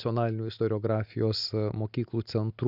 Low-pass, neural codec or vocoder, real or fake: 5.4 kHz; none; real